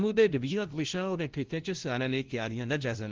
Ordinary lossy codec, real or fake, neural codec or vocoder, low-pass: Opus, 16 kbps; fake; codec, 16 kHz, 0.5 kbps, FunCodec, trained on LibriTTS, 25 frames a second; 7.2 kHz